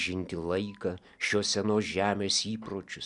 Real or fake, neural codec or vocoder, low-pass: real; none; 10.8 kHz